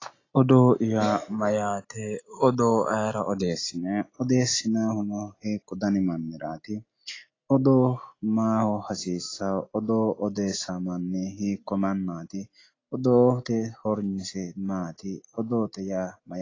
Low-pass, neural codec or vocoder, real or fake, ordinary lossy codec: 7.2 kHz; none; real; AAC, 32 kbps